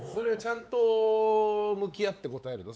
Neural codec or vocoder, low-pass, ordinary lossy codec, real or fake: codec, 16 kHz, 4 kbps, X-Codec, WavLM features, trained on Multilingual LibriSpeech; none; none; fake